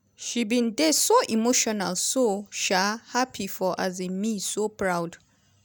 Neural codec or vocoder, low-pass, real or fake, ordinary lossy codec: none; none; real; none